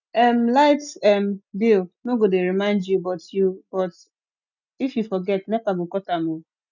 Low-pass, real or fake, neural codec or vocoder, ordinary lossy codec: 7.2 kHz; real; none; none